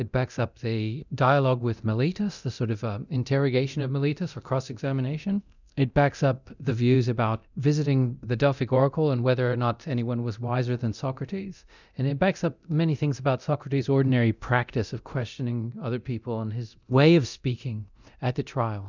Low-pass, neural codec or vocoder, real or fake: 7.2 kHz; codec, 24 kHz, 0.9 kbps, DualCodec; fake